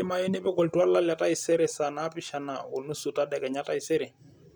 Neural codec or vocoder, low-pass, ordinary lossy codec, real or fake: none; none; none; real